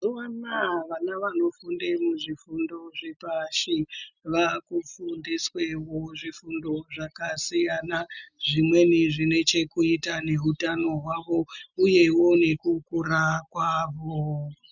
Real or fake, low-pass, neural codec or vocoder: real; 7.2 kHz; none